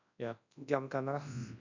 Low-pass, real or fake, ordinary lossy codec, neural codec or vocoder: 7.2 kHz; fake; none; codec, 24 kHz, 0.9 kbps, WavTokenizer, large speech release